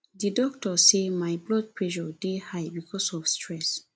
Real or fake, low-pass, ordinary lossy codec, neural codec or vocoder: real; none; none; none